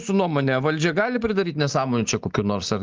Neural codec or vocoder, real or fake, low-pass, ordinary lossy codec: none; real; 7.2 kHz; Opus, 32 kbps